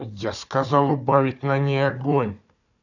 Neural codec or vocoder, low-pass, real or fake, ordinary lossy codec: codec, 16 kHz, 4 kbps, FunCodec, trained on Chinese and English, 50 frames a second; 7.2 kHz; fake; none